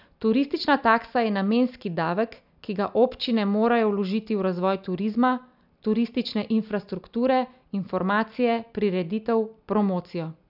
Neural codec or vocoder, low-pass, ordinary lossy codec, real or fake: none; 5.4 kHz; none; real